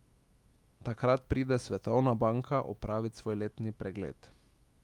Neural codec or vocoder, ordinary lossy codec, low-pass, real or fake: autoencoder, 48 kHz, 128 numbers a frame, DAC-VAE, trained on Japanese speech; Opus, 32 kbps; 19.8 kHz; fake